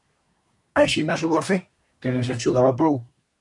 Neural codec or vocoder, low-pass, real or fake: codec, 24 kHz, 1 kbps, SNAC; 10.8 kHz; fake